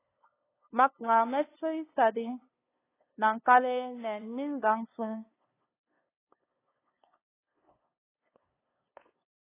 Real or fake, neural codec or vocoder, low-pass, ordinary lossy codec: fake; codec, 16 kHz, 2 kbps, FunCodec, trained on LibriTTS, 25 frames a second; 3.6 kHz; AAC, 16 kbps